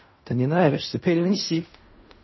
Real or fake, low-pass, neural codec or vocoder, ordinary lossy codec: fake; 7.2 kHz; codec, 16 kHz in and 24 kHz out, 0.4 kbps, LongCat-Audio-Codec, fine tuned four codebook decoder; MP3, 24 kbps